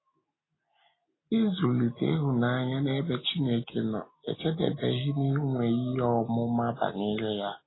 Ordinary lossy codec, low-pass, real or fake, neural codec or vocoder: AAC, 16 kbps; 7.2 kHz; real; none